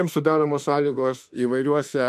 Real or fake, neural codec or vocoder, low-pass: fake; autoencoder, 48 kHz, 32 numbers a frame, DAC-VAE, trained on Japanese speech; 14.4 kHz